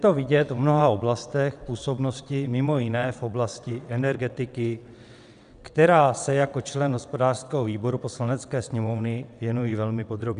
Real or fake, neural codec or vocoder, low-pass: fake; vocoder, 22.05 kHz, 80 mel bands, WaveNeXt; 9.9 kHz